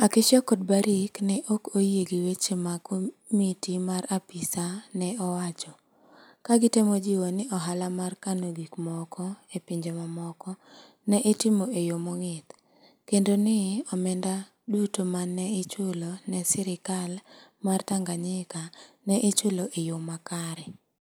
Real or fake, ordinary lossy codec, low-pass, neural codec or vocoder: real; none; none; none